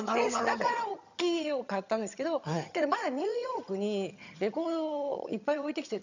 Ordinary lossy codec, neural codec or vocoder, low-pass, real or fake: none; vocoder, 22.05 kHz, 80 mel bands, HiFi-GAN; 7.2 kHz; fake